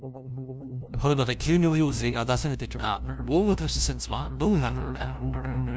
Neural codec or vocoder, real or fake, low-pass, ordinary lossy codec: codec, 16 kHz, 0.5 kbps, FunCodec, trained on LibriTTS, 25 frames a second; fake; none; none